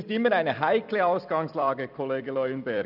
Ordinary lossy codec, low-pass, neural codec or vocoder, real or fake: none; 5.4 kHz; vocoder, 44.1 kHz, 128 mel bands every 256 samples, BigVGAN v2; fake